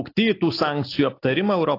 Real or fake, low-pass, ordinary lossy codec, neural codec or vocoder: real; 5.4 kHz; AAC, 32 kbps; none